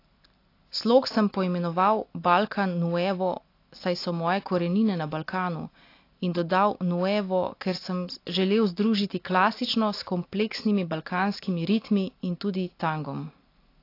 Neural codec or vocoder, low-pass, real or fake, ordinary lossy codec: none; 5.4 kHz; real; AAC, 32 kbps